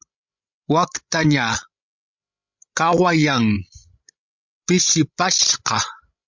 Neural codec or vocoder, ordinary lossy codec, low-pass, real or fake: codec, 16 kHz, 16 kbps, FreqCodec, larger model; MP3, 64 kbps; 7.2 kHz; fake